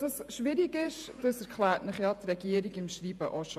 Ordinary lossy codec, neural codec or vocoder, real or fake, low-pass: MP3, 96 kbps; vocoder, 48 kHz, 128 mel bands, Vocos; fake; 14.4 kHz